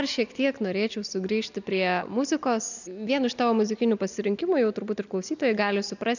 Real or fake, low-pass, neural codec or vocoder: real; 7.2 kHz; none